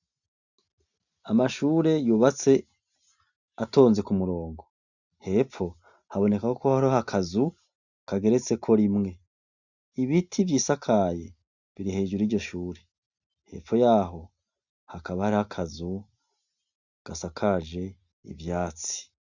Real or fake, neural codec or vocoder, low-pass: real; none; 7.2 kHz